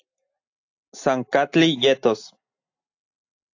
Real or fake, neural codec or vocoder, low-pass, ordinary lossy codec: real; none; 7.2 kHz; AAC, 48 kbps